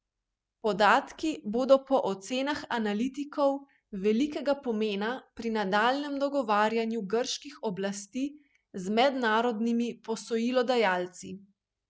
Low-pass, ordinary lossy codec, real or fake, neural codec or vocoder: none; none; real; none